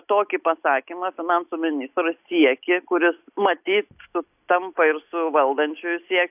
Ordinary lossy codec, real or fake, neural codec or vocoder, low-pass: AAC, 32 kbps; real; none; 3.6 kHz